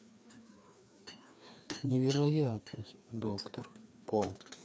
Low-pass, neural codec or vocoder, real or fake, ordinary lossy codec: none; codec, 16 kHz, 2 kbps, FreqCodec, larger model; fake; none